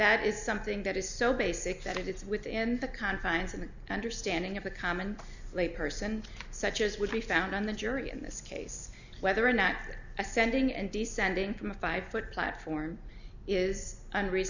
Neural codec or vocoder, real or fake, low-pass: none; real; 7.2 kHz